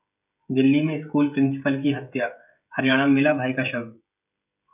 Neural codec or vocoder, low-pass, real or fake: codec, 16 kHz, 16 kbps, FreqCodec, smaller model; 3.6 kHz; fake